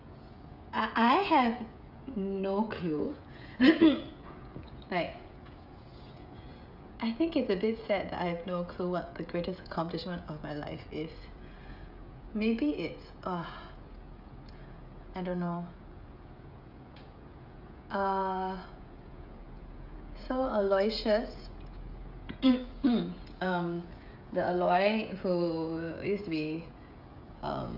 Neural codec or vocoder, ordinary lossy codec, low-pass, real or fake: codec, 16 kHz, 16 kbps, FreqCodec, smaller model; none; 5.4 kHz; fake